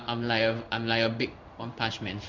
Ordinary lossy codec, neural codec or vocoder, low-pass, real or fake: MP3, 64 kbps; codec, 16 kHz in and 24 kHz out, 1 kbps, XY-Tokenizer; 7.2 kHz; fake